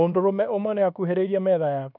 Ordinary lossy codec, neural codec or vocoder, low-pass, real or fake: none; codec, 24 kHz, 1.2 kbps, DualCodec; 5.4 kHz; fake